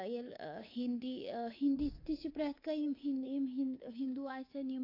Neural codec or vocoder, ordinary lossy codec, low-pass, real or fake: codec, 24 kHz, 3.1 kbps, DualCodec; AAC, 32 kbps; 5.4 kHz; fake